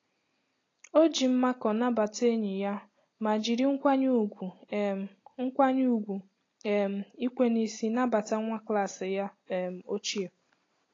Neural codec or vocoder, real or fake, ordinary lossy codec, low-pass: none; real; AAC, 32 kbps; 7.2 kHz